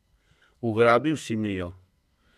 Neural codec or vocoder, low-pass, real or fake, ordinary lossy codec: codec, 32 kHz, 1.9 kbps, SNAC; 14.4 kHz; fake; none